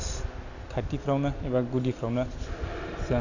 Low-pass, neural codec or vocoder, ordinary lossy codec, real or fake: 7.2 kHz; none; none; real